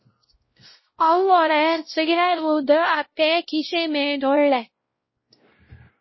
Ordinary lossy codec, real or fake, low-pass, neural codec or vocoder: MP3, 24 kbps; fake; 7.2 kHz; codec, 16 kHz, 0.5 kbps, X-Codec, WavLM features, trained on Multilingual LibriSpeech